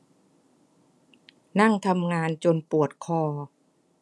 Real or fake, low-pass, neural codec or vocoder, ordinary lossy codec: real; none; none; none